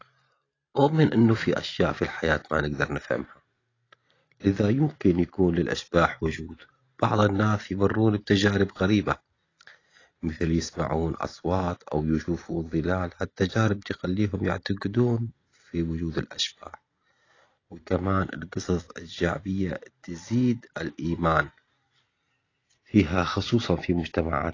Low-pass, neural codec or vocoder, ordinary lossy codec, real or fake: 7.2 kHz; none; AAC, 32 kbps; real